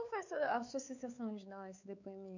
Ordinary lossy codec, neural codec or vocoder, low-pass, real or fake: none; codec, 16 kHz, 4 kbps, X-Codec, WavLM features, trained on Multilingual LibriSpeech; 7.2 kHz; fake